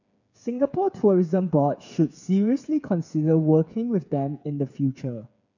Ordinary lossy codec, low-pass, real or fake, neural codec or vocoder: none; 7.2 kHz; fake; codec, 16 kHz, 8 kbps, FreqCodec, smaller model